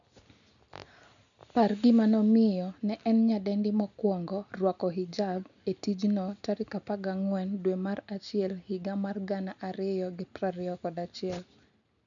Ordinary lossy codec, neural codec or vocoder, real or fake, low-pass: none; none; real; 7.2 kHz